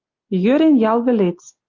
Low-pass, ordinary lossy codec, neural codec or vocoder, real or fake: 7.2 kHz; Opus, 24 kbps; none; real